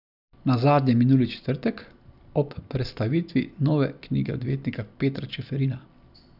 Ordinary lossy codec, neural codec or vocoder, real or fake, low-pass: none; none; real; 5.4 kHz